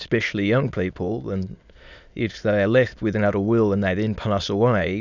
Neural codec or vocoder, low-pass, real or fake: autoencoder, 22.05 kHz, a latent of 192 numbers a frame, VITS, trained on many speakers; 7.2 kHz; fake